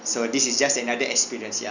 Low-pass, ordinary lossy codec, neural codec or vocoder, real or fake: 7.2 kHz; none; none; real